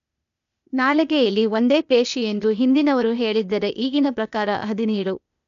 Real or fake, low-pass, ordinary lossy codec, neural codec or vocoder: fake; 7.2 kHz; none; codec, 16 kHz, 0.8 kbps, ZipCodec